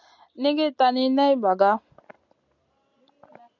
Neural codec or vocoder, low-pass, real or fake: none; 7.2 kHz; real